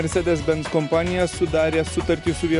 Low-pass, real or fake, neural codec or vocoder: 10.8 kHz; real; none